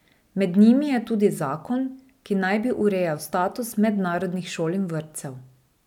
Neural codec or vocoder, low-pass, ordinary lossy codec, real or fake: none; 19.8 kHz; none; real